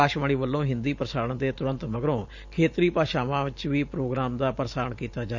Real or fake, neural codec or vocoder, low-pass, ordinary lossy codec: fake; vocoder, 44.1 kHz, 128 mel bands every 512 samples, BigVGAN v2; 7.2 kHz; none